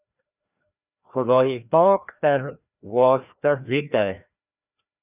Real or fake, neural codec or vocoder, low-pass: fake; codec, 16 kHz, 1 kbps, FreqCodec, larger model; 3.6 kHz